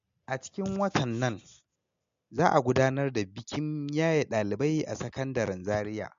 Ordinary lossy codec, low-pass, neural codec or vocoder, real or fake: MP3, 48 kbps; 7.2 kHz; none; real